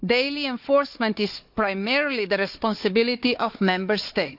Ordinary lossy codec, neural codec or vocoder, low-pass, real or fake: AAC, 48 kbps; codec, 16 kHz, 4 kbps, FunCodec, trained on Chinese and English, 50 frames a second; 5.4 kHz; fake